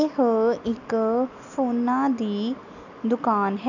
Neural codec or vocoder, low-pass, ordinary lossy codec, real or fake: none; 7.2 kHz; none; real